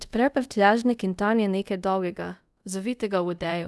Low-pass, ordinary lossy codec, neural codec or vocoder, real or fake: none; none; codec, 24 kHz, 0.5 kbps, DualCodec; fake